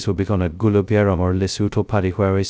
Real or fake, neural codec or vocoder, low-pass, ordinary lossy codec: fake; codec, 16 kHz, 0.2 kbps, FocalCodec; none; none